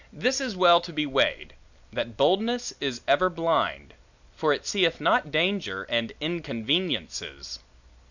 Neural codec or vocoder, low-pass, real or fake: none; 7.2 kHz; real